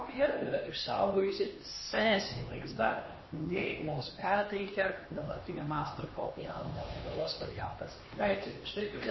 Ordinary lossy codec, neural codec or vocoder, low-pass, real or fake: MP3, 24 kbps; codec, 16 kHz, 2 kbps, X-Codec, HuBERT features, trained on LibriSpeech; 7.2 kHz; fake